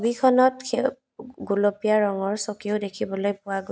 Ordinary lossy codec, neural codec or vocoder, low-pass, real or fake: none; none; none; real